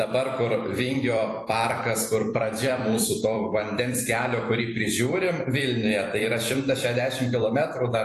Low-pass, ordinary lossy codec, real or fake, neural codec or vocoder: 14.4 kHz; AAC, 48 kbps; fake; vocoder, 44.1 kHz, 128 mel bands every 256 samples, BigVGAN v2